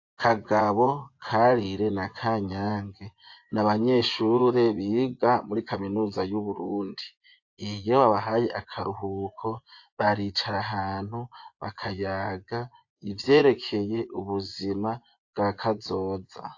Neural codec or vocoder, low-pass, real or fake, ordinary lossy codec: vocoder, 24 kHz, 100 mel bands, Vocos; 7.2 kHz; fake; AAC, 48 kbps